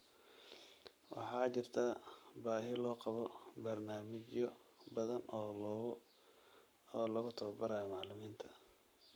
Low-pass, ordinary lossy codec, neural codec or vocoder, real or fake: none; none; codec, 44.1 kHz, 7.8 kbps, Pupu-Codec; fake